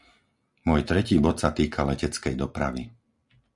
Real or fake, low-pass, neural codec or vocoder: real; 10.8 kHz; none